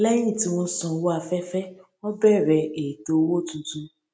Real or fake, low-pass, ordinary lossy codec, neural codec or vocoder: real; none; none; none